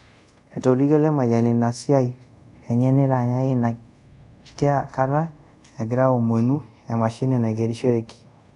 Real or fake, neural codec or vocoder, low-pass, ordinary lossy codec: fake; codec, 24 kHz, 0.5 kbps, DualCodec; 10.8 kHz; none